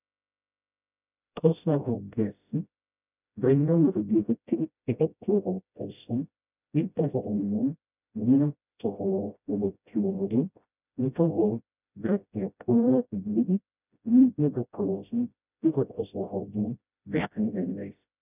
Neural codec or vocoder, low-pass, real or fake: codec, 16 kHz, 0.5 kbps, FreqCodec, smaller model; 3.6 kHz; fake